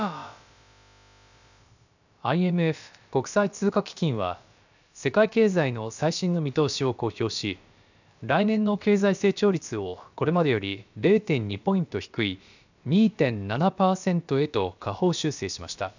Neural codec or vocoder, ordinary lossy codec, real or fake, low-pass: codec, 16 kHz, about 1 kbps, DyCAST, with the encoder's durations; none; fake; 7.2 kHz